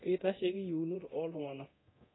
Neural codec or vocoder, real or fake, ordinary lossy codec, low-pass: codec, 24 kHz, 0.9 kbps, DualCodec; fake; AAC, 16 kbps; 7.2 kHz